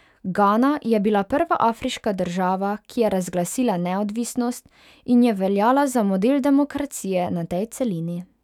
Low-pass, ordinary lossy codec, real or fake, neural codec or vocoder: 19.8 kHz; none; fake; autoencoder, 48 kHz, 128 numbers a frame, DAC-VAE, trained on Japanese speech